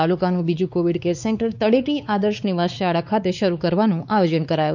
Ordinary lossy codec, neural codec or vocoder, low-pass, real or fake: none; codec, 16 kHz, 4 kbps, X-Codec, WavLM features, trained on Multilingual LibriSpeech; 7.2 kHz; fake